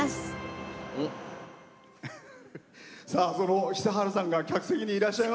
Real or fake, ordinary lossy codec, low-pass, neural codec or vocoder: real; none; none; none